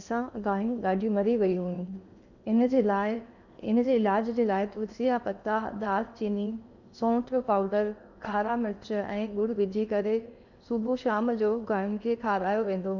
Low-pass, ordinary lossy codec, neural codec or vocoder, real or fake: 7.2 kHz; none; codec, 16 kHz in and 24 kHz out, 0.8 kbps, FocalCodec, streaming, 65536 codes; fake